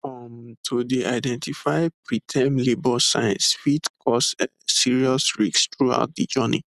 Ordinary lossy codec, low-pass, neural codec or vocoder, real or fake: none; 14.4 kHz; none; real